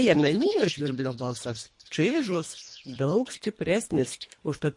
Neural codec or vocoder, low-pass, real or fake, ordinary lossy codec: codec, 24 kHz, 1.5 kbps, HILCodec; 10.8 kHz; fake; MP3, 48 kbps